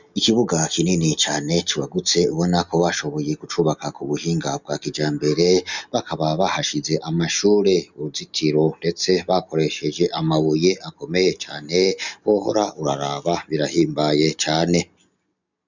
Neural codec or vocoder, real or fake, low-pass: none; real; 7.2 kHz